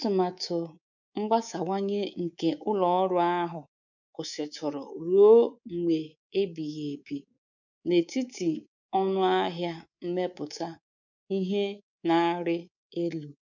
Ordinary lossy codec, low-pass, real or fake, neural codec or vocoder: none; 7.2 kHz; fake; codec, 24 kHz, 3.1 kbps, DualCodec